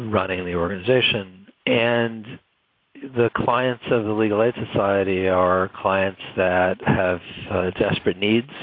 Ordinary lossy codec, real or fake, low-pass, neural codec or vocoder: AAC, 32 kbps; real; 5.4 kHz; none